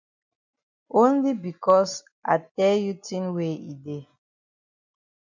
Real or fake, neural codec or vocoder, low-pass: real; none; 7.2 kHz